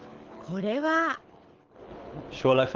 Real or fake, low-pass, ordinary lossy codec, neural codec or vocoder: fake; 7.2 kHz; Opus, 16 kbps; codec, 24 kHz, 6 kbps, HILCodec